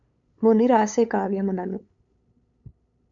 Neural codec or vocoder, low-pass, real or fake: codec, 16 kHz, 8 kbps, FunCodec, trained on LibriTTS, 25 frames a second; 7.2 kHz; fake